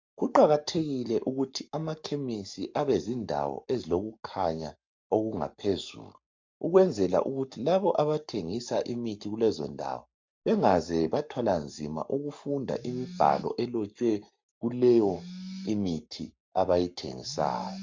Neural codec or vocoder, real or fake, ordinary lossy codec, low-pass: codec, 44.1 kHz, 7.8 kbps, Pupu-Codec; fake; MP3, 64 kbps; 7.2 kHz